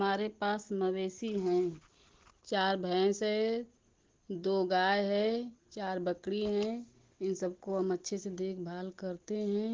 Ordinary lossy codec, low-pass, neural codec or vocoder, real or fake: Opus, 16 kbps; 7.2 kHz; none; real